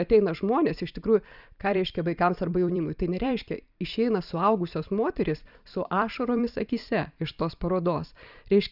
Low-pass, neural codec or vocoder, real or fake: 5.4 kHz; vocoder, 44.1 kHz, 128 mel bands every 256 samples, BigVGAN v2; fake